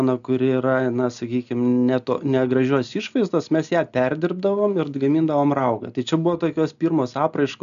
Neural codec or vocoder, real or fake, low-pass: none; real; 7.2 kHz